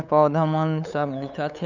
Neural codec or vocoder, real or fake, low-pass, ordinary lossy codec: codec, 16 kHz, 8 kbps, FunCodec, trained on LibriTTS, 25 frames a second; fake; 7.2 kHz; none